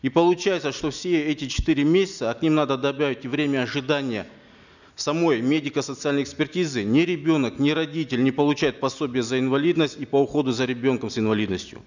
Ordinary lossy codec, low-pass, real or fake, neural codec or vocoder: none; 7.2 kHz; real; none